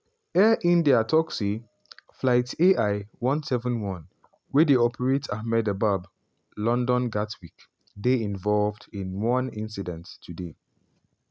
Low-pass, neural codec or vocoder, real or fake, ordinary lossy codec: none; none; real; none